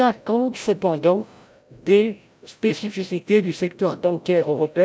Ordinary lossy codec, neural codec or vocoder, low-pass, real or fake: none; codec, 16 kHz, 0.5 kbps, FreqCodec, larger model; none; fake